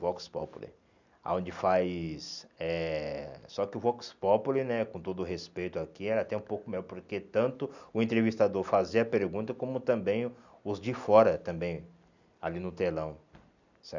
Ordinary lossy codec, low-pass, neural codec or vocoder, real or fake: none; 7.2 kHz; none; real